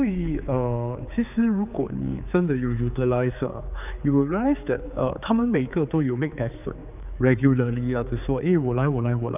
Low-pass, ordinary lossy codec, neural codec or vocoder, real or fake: 3.6 kHz; none; codec, 16 kHz, 4 kbps, X-Codec, HuBERT features, trained on general audio; fake